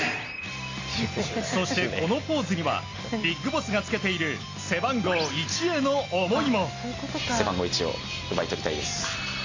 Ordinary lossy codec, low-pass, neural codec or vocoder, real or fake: AAC, 32 kbps; 7.2 kHz; none; real